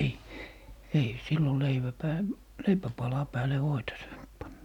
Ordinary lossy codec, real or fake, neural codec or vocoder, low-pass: none; real; none; 19.8 kHz